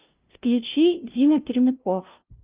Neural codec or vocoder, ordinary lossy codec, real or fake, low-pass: codec, 16 kHz, 0.5 kbps, FunCodec, trained on LibriTTS, 25 frames a second; Opus, 32 kbps; fake; 3.6 kHz